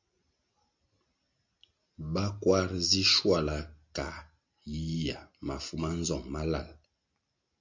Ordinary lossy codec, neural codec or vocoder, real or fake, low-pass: MP3, 48 kbps; none; real; 7.2 kHz